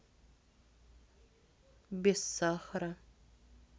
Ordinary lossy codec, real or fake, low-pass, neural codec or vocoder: none; real; none; none